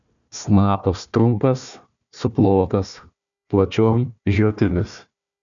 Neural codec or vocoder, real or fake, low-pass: codec, 16 kHz, 1 kbps, FunCodec, trained on Chinese and English, 50 frames a second; fake; 7.2 kHz